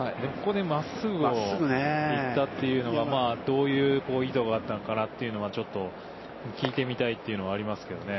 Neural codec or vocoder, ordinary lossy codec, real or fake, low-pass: none; MP3, 24 kbps; real; 7.2 kHz